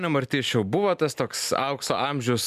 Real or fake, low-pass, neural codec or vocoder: real; 14.4 kHz; none